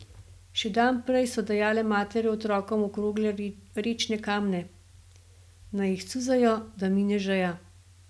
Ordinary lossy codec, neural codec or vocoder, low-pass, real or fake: none; none; none; real